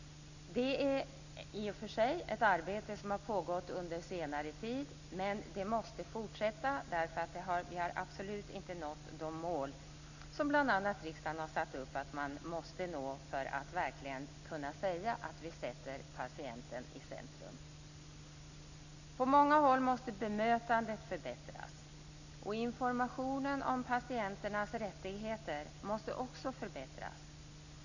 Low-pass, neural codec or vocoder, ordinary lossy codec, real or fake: 7.2 kHz; none; none; real